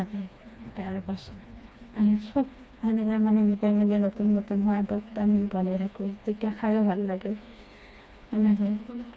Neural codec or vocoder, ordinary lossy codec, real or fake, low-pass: codec, 16 kHz, 2 kbps, FreqCodec, smaller model; none; fake; none